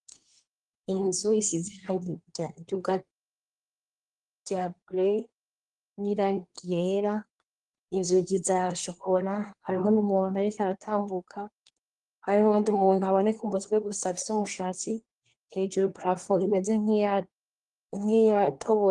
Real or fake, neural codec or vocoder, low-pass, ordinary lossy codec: fake; codec, 24 kHz, 1 kbps, SNAC; 10.8 kHz; Opus, 24 kbps